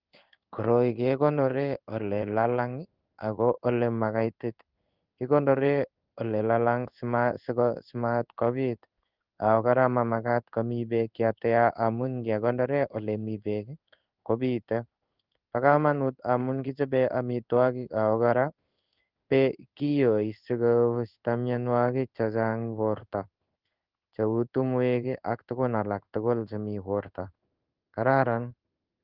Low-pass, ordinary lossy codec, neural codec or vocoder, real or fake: 5.4 kHz; Opus, 24 kbps; codec, 16 kHz in and 24 kHz out, 1 kbps, XY-Tokenizer; fake